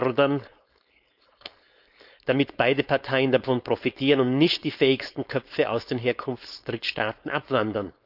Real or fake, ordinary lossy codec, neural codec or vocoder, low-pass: fake; none; codec, 16 kHz, 4.8 kbps, FACodec; 5.4 kHz